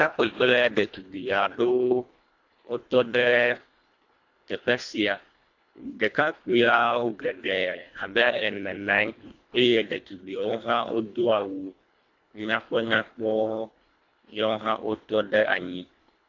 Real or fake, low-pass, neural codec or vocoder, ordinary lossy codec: fake; 7.2 kHz; codec, 24 kHz, 1.5 kbps, HILCodec; AAC, 48 kbps